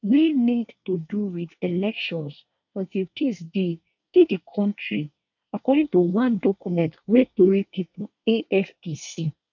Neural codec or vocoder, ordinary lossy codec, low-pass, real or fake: codec, 24 kHz, 1 kbps, SNAC; none; 7.2 kHz; fake